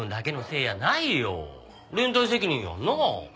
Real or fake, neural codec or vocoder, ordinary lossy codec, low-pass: real; none; none; none